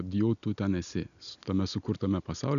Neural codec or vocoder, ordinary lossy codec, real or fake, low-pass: none; MP3, 96 kbps; real; 7.2 kHz